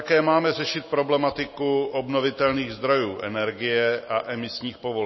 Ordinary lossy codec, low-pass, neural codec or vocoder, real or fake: MP3, 24 kbps; 7.2 kHz; none; real